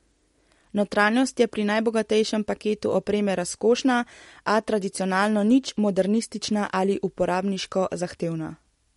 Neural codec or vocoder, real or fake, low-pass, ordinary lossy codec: none; real; 19.8 kHz; MP3, 48 kbps